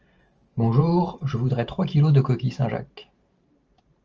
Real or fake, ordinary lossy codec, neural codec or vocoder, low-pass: fake; Opus, 24 kbps; vocoder, 44.1 kHz, 128 mel bands every 512 samples, BigVGAN v2; 7.2 kHz